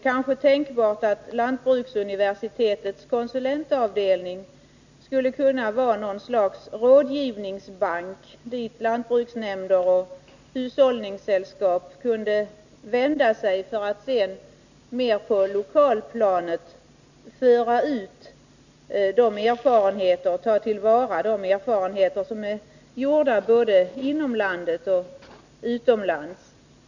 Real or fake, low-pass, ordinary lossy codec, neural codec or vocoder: real; 7.2 kHz; none; none